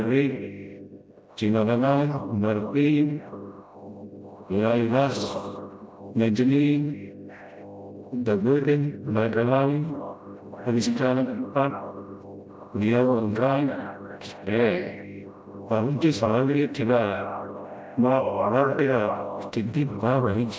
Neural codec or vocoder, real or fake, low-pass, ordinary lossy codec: codec, 16 kHz, 0.5 kbps, FreqCodec, smaller model; fake; none; none